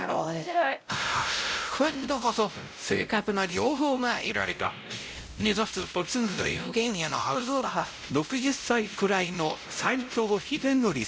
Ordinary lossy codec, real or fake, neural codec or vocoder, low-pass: none; fake; codec, 16 kHz, 0.5 kbps, X-Codec, WavLM features, trained on Multilingual LibriSpeech; none